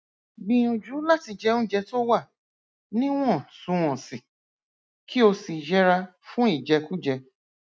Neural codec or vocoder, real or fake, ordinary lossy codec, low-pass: none; real; none; none